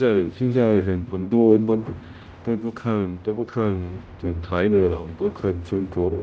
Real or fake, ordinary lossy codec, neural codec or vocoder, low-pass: fake; none; codec, 16 kHz, 0.5 kbps, X-Codec, HuBERT features, trained on general audio; none